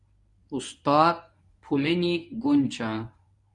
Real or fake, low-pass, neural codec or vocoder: fake; 10.8 kHz; codec, 24 kHz, 0.9 kbps, WavTokenizer, medium speech release version 1